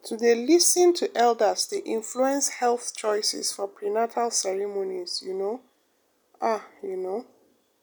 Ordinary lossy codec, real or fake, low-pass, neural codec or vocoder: none; real; none; none